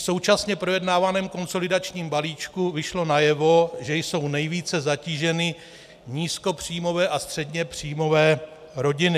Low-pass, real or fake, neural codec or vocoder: 14.4 kHz; real; none